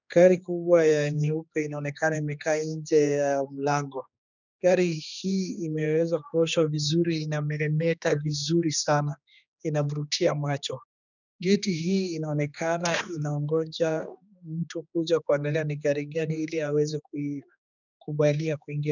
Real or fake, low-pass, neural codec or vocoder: fake; 7.2 kHz; codec, 16 kHz, 2 kbps, X-Codec, HuBERT features, trained on general audio